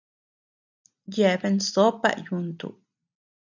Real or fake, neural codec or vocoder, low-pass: real; none; 7.2 kHz